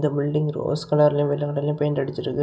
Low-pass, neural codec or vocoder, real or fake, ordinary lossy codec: none; none; real; none